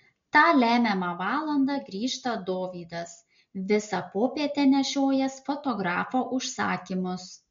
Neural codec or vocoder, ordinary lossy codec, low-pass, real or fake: none; MP3, 48 kbps; 7.2 kHz; real